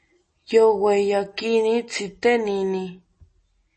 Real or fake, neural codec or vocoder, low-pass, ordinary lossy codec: real; none; 10.8 kHz; MP3, 32 kbps